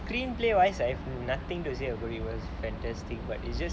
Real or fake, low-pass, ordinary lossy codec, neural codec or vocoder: real; none; none; none